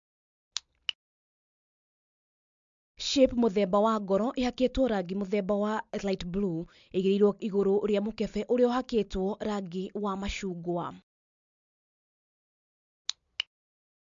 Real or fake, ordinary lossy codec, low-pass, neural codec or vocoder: real; MP3, 64 kbps; 7.2 kHz; none